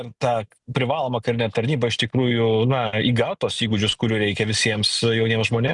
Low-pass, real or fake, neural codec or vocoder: 10.8 kHz; real; none